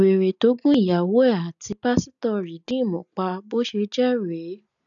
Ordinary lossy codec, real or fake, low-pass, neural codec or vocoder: none; real; 7.2 kHz; none